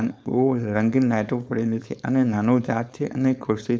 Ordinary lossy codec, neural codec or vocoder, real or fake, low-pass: none; codec, 16 kHz, 4.8 kbps, FACodec; fake; none